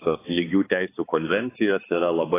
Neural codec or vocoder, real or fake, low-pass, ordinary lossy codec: codec, 16 kHz, 4 kbps, X-Codec, HuBERT features, trained on balanced general audio; fake; 3.6 kHz; AAC, 16 kbps